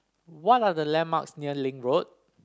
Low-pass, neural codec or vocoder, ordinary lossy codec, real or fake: none; none; none; real